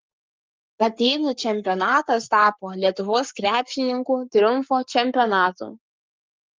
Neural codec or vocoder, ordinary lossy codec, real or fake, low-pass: codec, 44.1 kHz, 7.8 kbps, Pupu-Codec; Opus, 32 kbps; fake; 7.2 kHz